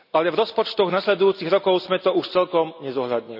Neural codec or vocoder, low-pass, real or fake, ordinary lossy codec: none; 5.4 kHz; real; none